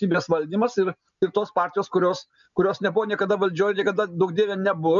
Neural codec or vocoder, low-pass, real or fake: none; 7.2 kHz; real